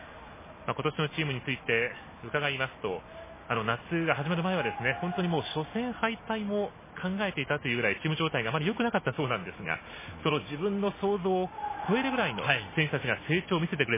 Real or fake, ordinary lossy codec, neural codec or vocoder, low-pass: real; MP3, 16 kbps; none; 3.6 kHz